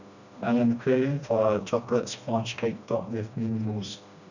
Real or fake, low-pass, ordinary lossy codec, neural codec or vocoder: fake; 7.2 kHz; none; codec, 16 kHz, 1 kbps, FreqCodec, smaller model